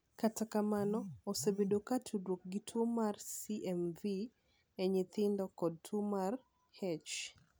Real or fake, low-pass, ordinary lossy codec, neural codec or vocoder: real; none; none; none